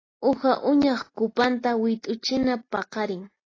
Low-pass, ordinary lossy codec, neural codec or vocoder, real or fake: 7.2 kHz; AAC, 32 kbps; none; real